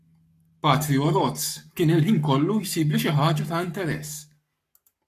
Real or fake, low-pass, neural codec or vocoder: fake; 14.4 kHz; codec, 44.1 kHz, 7.8 kbps, Pupu-Codec